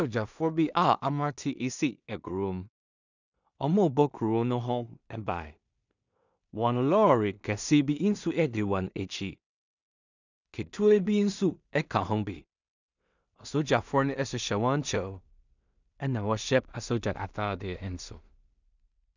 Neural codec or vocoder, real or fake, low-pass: codec, 16 kHz in and 24 kHz out, 0.4 kbps, LongCat-Audio-Codec, two codebook decoder; fake; 7.2 kHz